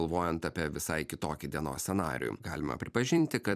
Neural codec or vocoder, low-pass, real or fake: vocoder, 44.1 kHz, 128 mel bands every 256 samples, BigVGAN v2; 14.4 kHz; fake